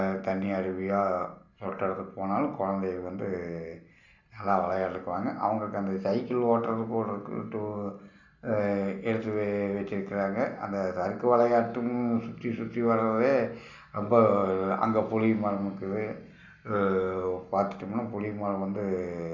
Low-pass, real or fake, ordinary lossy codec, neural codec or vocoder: 7.2 kHz; real; none; none